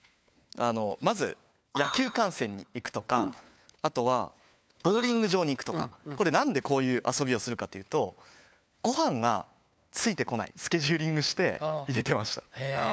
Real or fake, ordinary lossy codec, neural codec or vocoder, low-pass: fake; none; codec, 16 kHz, 4 kbps, FunCodec, trained on LibriTTS, 50 frames a second; none